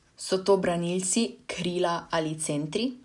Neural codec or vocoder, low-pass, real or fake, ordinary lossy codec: none; 10.8 kHz; real; none